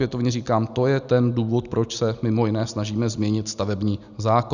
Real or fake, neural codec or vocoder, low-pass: real; none; 7.2 kHz